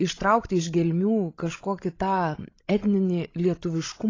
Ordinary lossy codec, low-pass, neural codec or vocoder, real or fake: AAC, 32 kbps; 7.2 kHz; codec, 16 kHz, 16 kbps, FreqCodec, larger model; fake